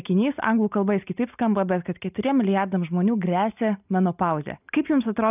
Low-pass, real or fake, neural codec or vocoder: 3.6 kHz; real; none